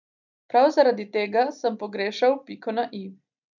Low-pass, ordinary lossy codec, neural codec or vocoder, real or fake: 7.2 kHz; none; none; real